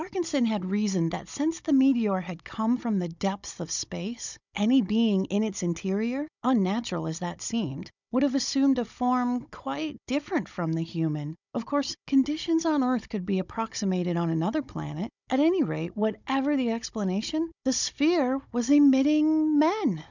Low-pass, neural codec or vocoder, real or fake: 7.2 kHz; codec, 16 kHz, 16 kbps, FunCodec, trained on Chinese and English, 50 frames a second; fake